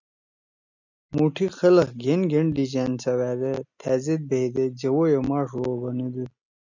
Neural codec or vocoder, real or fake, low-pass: none; real; 7.2 kHz